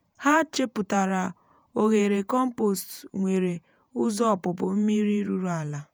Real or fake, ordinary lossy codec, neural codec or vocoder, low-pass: fake; none; vocoder, 48 kHz, 128 mel bands, Vocos; none